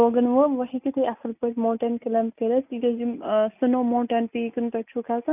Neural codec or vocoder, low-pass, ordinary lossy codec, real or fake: none; 3.6 kHz; none; real